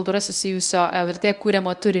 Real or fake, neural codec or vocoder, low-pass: fake; codec, 24 kHz, 0.9 kbps, WavTokenizer, medium speech release version 1; 10.8 kHz